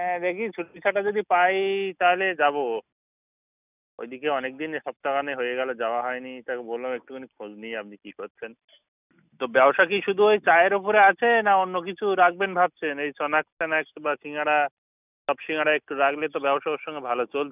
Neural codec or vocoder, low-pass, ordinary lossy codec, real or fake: none; 3.6 kHz; none; real